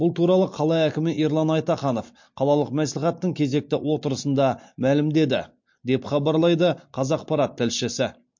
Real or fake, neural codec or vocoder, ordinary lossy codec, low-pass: real; none; MP3, 48 kbps; 7.2 kHz